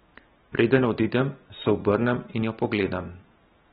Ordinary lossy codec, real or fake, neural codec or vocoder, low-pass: AAC, 16 kbps; real; none; 14.4 kHz